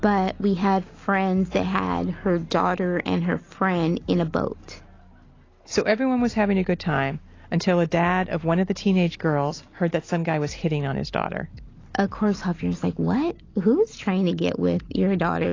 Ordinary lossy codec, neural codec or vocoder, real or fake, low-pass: AAC, 32 kbps; none; real; 7.2 kHz